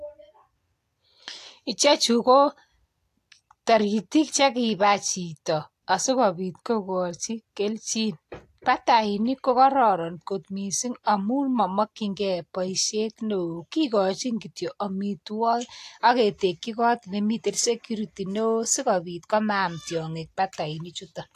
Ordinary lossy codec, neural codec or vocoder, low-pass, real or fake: AAC, 64 kbps; none; 14.4 kHz; real